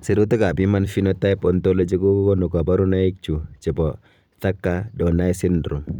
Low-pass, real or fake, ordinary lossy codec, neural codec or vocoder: 19.8 kHz; fake; none; vocoder, 44.1 kHz, 128 mel bands every 256 samples, BigVGAN v2